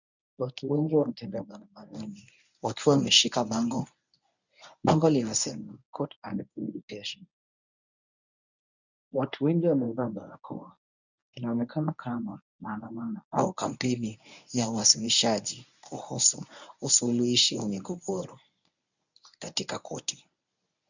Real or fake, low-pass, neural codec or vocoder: fake; 7.2 kHz; codec, 24 kHz, 0.9 kbps, WavTokenizer, medium speech release version 1